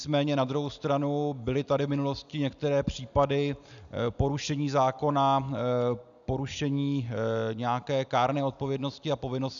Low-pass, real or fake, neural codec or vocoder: 7.2 kHz; real; none